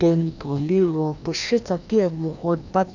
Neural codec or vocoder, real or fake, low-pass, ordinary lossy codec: codec, 16 kHz, 1 kbps, FreqCodec, larger model; fake; 7.2 kHz; none